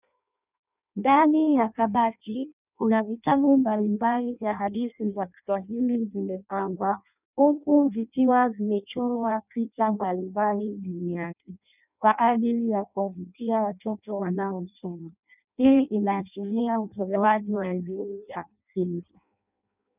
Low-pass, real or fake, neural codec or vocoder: 3.6 kHz; fake; codec, 16 kHz in and 24 kHz out, 0.6 kbps, FireRedTTS-2 codec